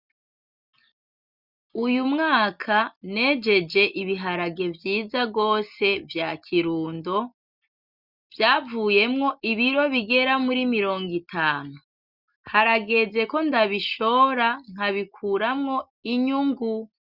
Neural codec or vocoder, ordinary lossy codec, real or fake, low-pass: none; Opus, 64 kbps; real; 5.4 kHz